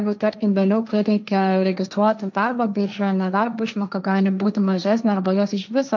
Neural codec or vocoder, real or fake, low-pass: codec, 16 kHz, 1.1 kbps, Voila-Tokenizer; fake; 7.2 kHz